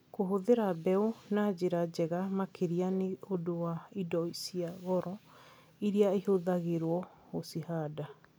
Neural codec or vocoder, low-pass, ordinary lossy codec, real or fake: none; none; none; real